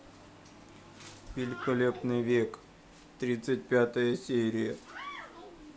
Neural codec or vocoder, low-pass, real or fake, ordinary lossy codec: none; none; real; none